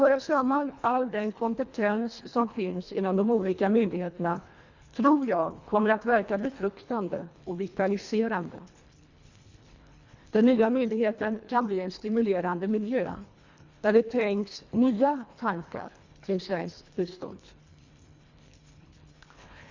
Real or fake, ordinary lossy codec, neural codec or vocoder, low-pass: fake; Opus, 64 kbps; codec, 24 kHz, 1.5 kbps, HILCodec; 7.2 kHz